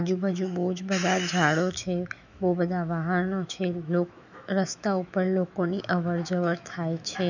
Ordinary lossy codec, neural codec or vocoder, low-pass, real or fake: none; autoencoder, 48 kHz, 128 numbers a frame, DAC-VAE, trained on Japanese speech; 7.2 kHz; fake